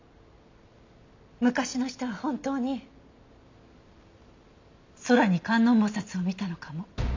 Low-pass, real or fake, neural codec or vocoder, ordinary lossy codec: 7.2 kHz; real; none; MP3, 64 kbps